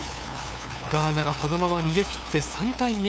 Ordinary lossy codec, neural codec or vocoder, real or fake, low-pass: none; codec, 16 kHz, 2 kbps, FunCodec, trained on LibriTTS, 25 frames a second; fake; none